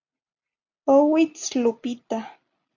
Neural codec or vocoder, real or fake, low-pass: none; real; 7.2 kHz